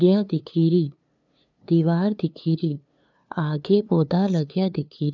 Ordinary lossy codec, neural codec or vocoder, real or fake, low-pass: none; codec, 16 kHz, 2 kbps, FunCodec, trained on LibriTTS, 25 frames a second; fake; 7.2 kHz